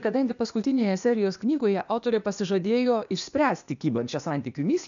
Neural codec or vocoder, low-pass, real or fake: codec, 16 kHz, 0.8 kbps, ZipCodec; 7.2 kHz; fake